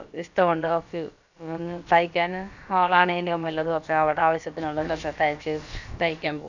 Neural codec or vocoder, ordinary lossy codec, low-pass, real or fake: codec, 16 kHz, about 1 kbps, DyCAST, with the encoder's durations; none; 7.2 kHz; fake